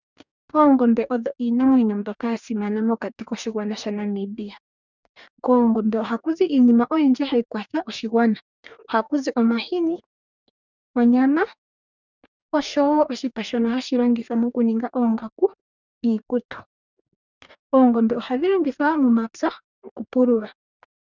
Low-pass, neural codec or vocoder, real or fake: 7.2 kHz; codec, 44.1 kHz, 2.6 kbps, DAC; fake